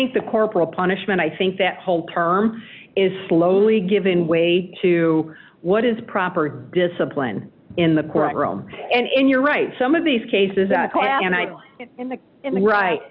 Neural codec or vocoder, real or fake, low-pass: none; real; 5.4 kHz